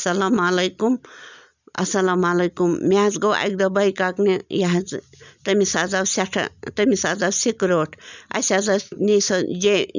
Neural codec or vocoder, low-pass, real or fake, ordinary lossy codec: none; 7.2 kHz; real; none